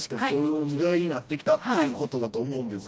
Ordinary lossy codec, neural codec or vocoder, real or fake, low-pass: none; codec, 16 kHz, 1 kbps, FreqCodec, smaller model; fake; none